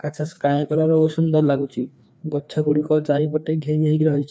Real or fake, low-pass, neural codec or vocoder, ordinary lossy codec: fake; none; codec, 16 kHz, 2 kbps, FreqCodec, larger model; none